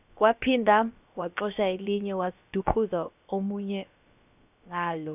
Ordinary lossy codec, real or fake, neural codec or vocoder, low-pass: none; fake; codec, 16 kHz, about 1 kbps, DyCAST, with the encoder's durations; 3.6 kHz